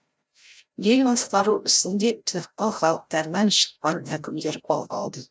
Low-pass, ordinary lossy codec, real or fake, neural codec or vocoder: none; none; fake; codec, 16 kHz, 0.5 kbps, FreqCodec, larger model